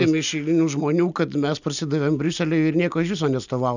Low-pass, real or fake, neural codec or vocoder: 7.2 kHz; real; none